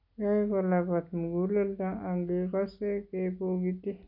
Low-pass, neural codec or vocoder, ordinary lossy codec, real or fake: 5.4 kHz; none; none; real